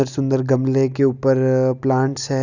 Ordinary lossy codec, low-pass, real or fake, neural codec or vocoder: none; 7.2 kHz; real; none